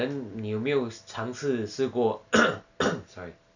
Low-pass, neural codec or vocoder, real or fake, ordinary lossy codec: 7.2 kHz; none; real; none